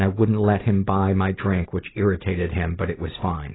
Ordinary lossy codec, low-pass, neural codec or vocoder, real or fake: AAC, 16 kbps; 7.2 kHz; none; real